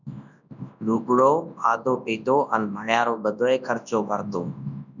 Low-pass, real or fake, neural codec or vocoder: 7.2 kHz; fake; codec, 24 kHz, 0.9 kbps, WavTokenizer, large speech release